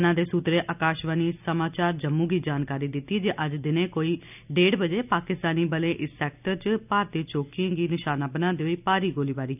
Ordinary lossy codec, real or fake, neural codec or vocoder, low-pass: none; real; none; 3.6 kHz